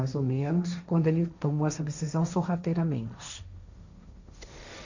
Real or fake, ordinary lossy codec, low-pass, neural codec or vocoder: fake; none; 7.2 kHz; codec, 16 kHz, 1.1 kbps, Voila-Tokenizer